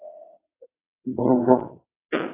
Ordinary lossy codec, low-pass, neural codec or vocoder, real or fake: AAC, 16 kbps; 3.6 kHz; vocoder, 22.05 kHz, 80 mel bands, WaveNeXt; fake